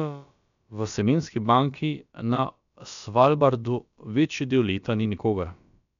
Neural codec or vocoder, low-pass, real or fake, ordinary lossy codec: codec, 16 kHz, about 1 kbps, DyCAST, with the encoder's durations; 7.2 kHz; fake; none